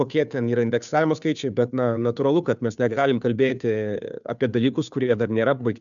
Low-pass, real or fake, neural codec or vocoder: 7.2 kHz; fake; codec, 16 kHz, 2 kbps, FunCodec, trained on Chinese and English, 25 frames a second